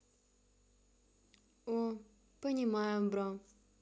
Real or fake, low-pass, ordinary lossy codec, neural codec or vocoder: real; none; none; none